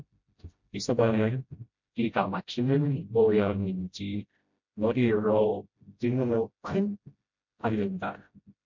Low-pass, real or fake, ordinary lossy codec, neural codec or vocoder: 7.2 kHz; fake; MP3, 48 kbps; codec, 16 kHz, 0.5 kbps, FreqCodec, smaller model